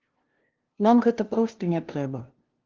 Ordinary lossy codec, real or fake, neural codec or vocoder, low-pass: Opus, 16 kbps; fake; codec, 16 kHz, 0.5 kbps, FunCodec, trained on LibriTTS, 25 frames a second; 7.2 kHz